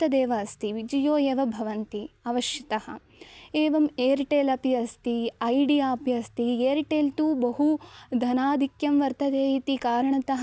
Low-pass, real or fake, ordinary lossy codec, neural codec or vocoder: none; real; none; none